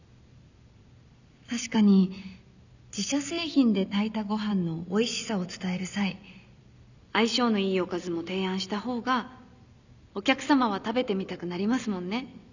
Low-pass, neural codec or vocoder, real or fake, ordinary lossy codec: 7.2 kHz; none; real; none